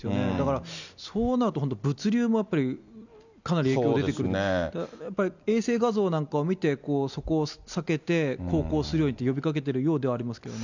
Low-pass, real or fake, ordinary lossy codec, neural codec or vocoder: 7.2 kHz; real; none; none